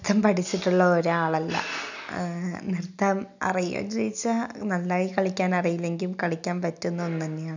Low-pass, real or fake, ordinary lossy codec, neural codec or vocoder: 7.2 kHz; real; none; none